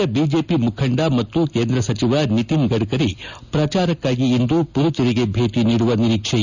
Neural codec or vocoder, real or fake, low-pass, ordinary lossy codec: none; real; 7.2 kHz; none